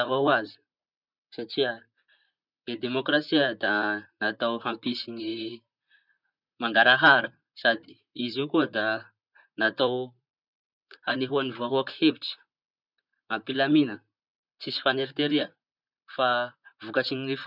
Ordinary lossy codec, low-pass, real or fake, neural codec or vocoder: none; 5.4 kHz; fake; vocoder, 44.1 kHz, 128 mel bands, Pupu-Vocoder